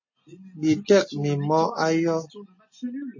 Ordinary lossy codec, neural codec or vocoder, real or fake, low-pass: MP3, 32 kbps; none; real; 7.2 kHz